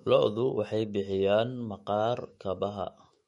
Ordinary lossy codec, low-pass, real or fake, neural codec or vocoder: MP3, 48 kbps; 19.8 kHz; fake; autoencoder, 48 kHz, 128 numbers a frame, DAC-VAE, trained on Japanese speech